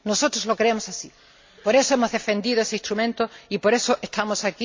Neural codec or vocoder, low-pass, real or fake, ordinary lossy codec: none; 7.2 kHz; real; MP3, 64 kbps